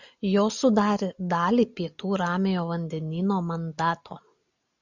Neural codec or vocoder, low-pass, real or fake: none; 7.2 kHz; real